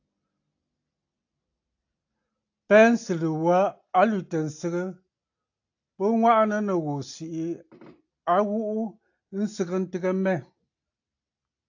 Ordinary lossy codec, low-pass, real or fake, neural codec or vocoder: AAC, 48 kbps; 7.2 kHz; real; none